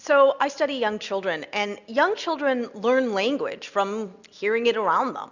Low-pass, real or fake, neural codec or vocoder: 7.2 kHz; real; none